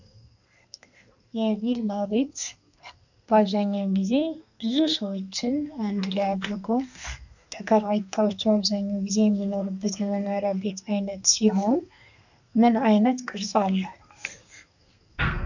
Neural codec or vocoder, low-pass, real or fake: codec, 32 kHz, 1.9 kbps, SNAC; 7.2 kHz; fake